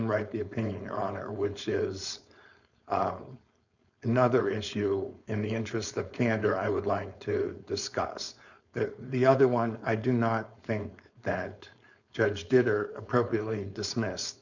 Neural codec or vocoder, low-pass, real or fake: codec, 16 kHz, 4.8 kbps, FACodec; 7.2 kHz; fake